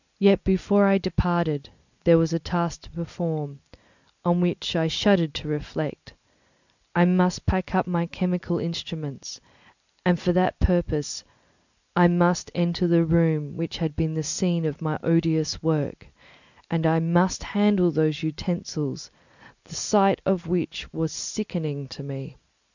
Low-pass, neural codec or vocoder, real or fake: 7.2 kHz; none; real